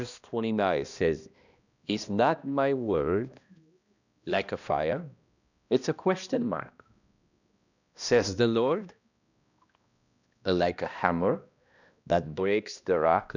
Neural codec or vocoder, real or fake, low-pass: codec, 16 kHz, 1 kbps, X-Codec, HuBERT features, trained on balanced general audio; fake; 7.2 kHz